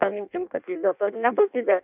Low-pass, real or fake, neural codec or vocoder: 3.6 kHz; fake; codec, 16 kHz in and 24 kHz out, 0.6 kbps, FireRedTTS-2 codec